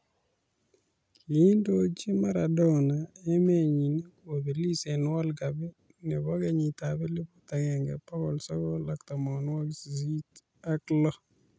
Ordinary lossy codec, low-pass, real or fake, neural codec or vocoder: none; none; real; none